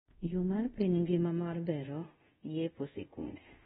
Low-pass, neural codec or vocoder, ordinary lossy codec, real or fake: 10.8 kHz; codec, 24 kHz, 0.5 kbps, DualCodec; AAC, 16 kbps; fake